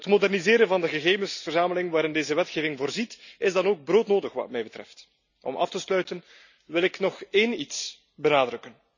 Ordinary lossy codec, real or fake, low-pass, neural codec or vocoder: none; real; 7.2 kHz; none